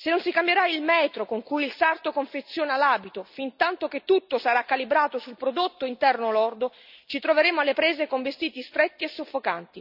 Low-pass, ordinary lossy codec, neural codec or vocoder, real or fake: 5.4 kHz; none; none; real